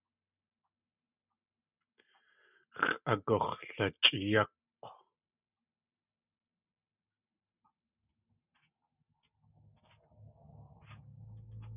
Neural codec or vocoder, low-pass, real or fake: none; 3.6 kHz; real